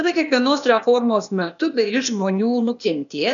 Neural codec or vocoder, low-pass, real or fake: codec, 16 kHz, 0.8 kbps, ZipCodec; 7.2 kHz; fake